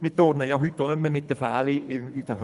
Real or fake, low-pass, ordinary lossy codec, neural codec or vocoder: fake; 10.8 kHz; none; codec, 24 kHz, 3 kbps, HILCodec